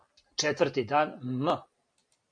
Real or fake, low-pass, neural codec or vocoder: real; 9.9 kHz; none